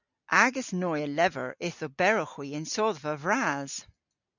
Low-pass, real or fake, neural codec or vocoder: 7.2 kHz; real; none